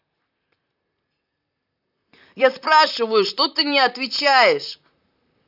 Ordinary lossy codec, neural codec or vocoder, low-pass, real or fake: none; vocoder, 44.1 kHz, 128 mel bands, Pupu-Vocoder; 5.4 kHz; fake